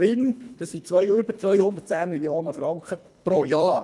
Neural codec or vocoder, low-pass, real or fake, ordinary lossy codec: codec, 24 kHz, 1.5 kbps, HILCodec; none; fake; none